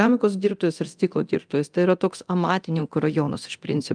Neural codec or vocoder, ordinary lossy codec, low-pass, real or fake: codec, 24 kHz, 0.9 kbps, DualCodec; Opus, 32 kbps; 9.9 kHz; fake